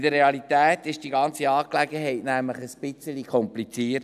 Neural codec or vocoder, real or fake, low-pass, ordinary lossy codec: none; real; 14.4 kHz; none